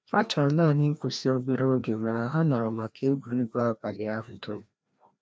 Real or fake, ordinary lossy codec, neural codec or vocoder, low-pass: fake; none; codec, 16 kHz, 1 kbps, FreqCodec, larger model; none